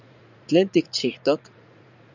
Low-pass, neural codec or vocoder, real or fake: 7.2 kHz; vocoder, 44.1 kHz, 80 mel bands, Vocos; fake